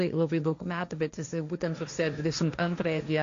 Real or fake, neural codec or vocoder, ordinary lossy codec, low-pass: fake; codec, 16 kHz, 1.1 kbps, Voila-Tokenizer; AAC, 48 kbps; 7.2 kHz